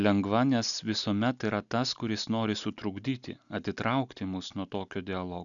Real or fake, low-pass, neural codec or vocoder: real; 7.2 kHz; none